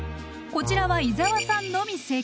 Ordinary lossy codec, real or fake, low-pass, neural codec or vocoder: none; real; none; none